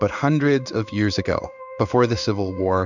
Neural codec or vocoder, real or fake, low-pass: codec, 16 kHz in and 24 kHz out, 1 kbps, XY-Tokenizer; fake; 7.2 kHz